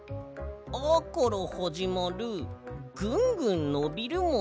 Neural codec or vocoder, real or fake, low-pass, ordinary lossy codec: none; real; none; none